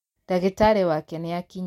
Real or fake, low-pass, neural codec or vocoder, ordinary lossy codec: real; 19.8 kHz; none; MP3, 64 kbps